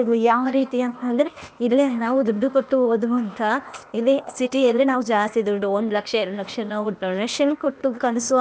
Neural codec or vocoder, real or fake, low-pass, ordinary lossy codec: codec, 16 kHz, 0.8 kbps, ZipCodec; fake; none; none